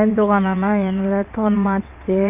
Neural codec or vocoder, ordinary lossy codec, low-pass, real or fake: vocoder, 22.05 kHz, 80 mel bands, WaveNeXt; none; 3.6 kHz; fake